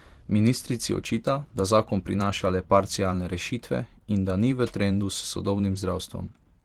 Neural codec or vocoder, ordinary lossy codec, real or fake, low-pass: vocoder, 44.1 kHz, 128 mel bands every 512 samples, BigVGAN v2; Opus, 16 kbps; fake; 19.8 kHz